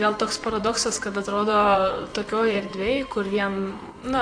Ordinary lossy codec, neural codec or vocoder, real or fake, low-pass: AAC, 48 kbps; vocoder, 44.1 kHz, 128 mel bands, Pupu-Vocoder; fake; 9.9 kHz